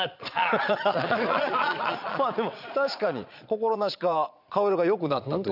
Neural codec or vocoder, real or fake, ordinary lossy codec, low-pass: vocoder, 22.05 kHz, 80 mel bands, Vocos; fake; none; 5.4 kHz